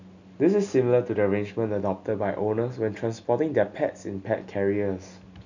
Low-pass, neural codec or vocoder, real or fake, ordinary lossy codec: 7.2 kHz; none; real; none